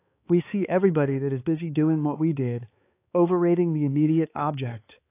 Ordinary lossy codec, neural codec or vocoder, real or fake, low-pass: AAC, 24 kbps; codec, 16 kHz, 4 kbps, X-Codec, HuBERT features, trained on balanced general audio; fake; 3.6 kHz